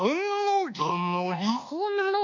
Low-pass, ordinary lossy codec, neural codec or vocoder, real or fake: 7.2 kHz; none; codec, 24 kHz, 1.2 kbps, DualCodec; fake